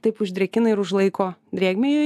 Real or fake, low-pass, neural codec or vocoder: real; 14.4 kHz; none